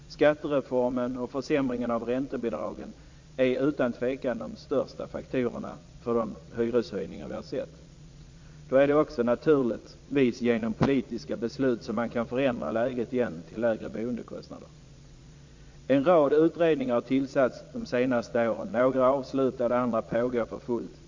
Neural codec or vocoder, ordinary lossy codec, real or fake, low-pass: vocoder, 22.05 kHz, 80 mel bands, Vocos; MP3, 48 kbps; fake; 7.2 kHz